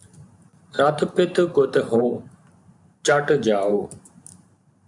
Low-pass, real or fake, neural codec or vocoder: 10.8 kHz; fake; vocoder, 44.1 kHz, 128 mel bands every 512 samples, BigVGAN v2